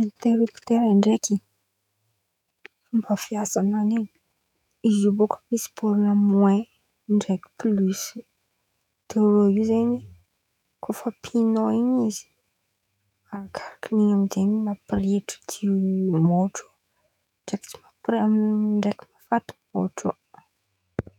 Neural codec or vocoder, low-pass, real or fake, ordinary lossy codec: autoencoder, 48 kHz, 128 numbers a frame, DAC-VAE, trained on Japanese speech; 19.8 kHz; fake; none